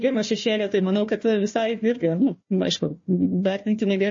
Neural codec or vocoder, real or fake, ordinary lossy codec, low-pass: codec, 16 kHz, 1 kbps, FunCodec, trained on Chinese and English, 50 frames a second; fake; MP3, 32 kbps; 7.2 kHz